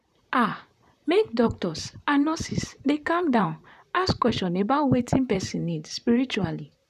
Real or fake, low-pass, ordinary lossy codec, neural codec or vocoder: fake; 14.4 kHz; none; vocoder, 44.1 kHz, 128 mel bands, Pupu-Vocoder